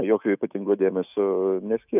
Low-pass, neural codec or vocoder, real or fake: 3.6 kHz; autoencoder, 48 kHz, 128 numbers a frame, DAC-VAE, trained on Japanese speech; fake